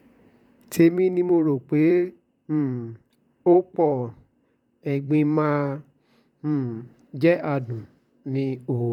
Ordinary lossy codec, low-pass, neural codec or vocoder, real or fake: none; 19.8 kHz; vocoder, 44.1 kHz, 128 mel bands, Pupu-Vocoder; fake